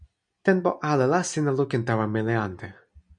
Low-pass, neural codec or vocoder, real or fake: 9.9 kHz; none; real